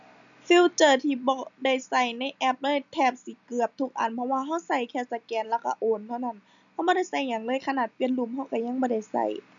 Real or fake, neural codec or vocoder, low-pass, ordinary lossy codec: real; none; 7.2 kHz; MP3, 96 kbps